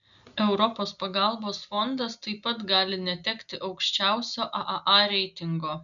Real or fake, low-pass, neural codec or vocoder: real; 7.2 kHz; none